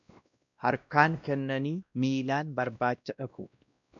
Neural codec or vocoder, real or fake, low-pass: codec, 16 kHz, 1 kbps, X-Codec, WavLM features, trained on Multilingual LibriSpeech; fake; 7.2 kHz